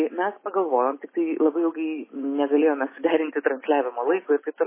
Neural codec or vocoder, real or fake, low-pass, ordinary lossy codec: none; real; 3.6 kHz; MP3, 16 kbps